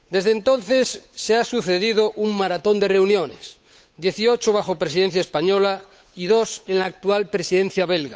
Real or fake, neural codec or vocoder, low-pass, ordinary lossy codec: fake; codec, 16 kHz, 8 kbps, FunCodec, trained on Chinese and English, 25 frames a second; none; none